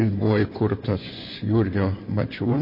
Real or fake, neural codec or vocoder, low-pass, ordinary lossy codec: fake; vocoder, 24 kHz, 100 mel bands, Vocos; 5.4 kHz; MP3, 24 kbps